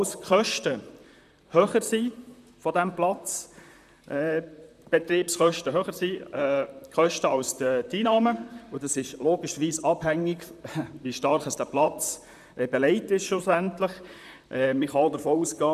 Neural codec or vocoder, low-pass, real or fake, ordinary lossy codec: vocoder, 44.1 kHz, 128 mel bands, Pupu-Vocoder; 14.4 kHz; fake; none